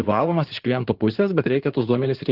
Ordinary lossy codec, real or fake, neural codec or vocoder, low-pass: Opus, 16 kbps; fake; codec, 16 kHz in and 24 kHz out, 2.2 kbps, FireRedTTS-2 codec; 5.4 kHz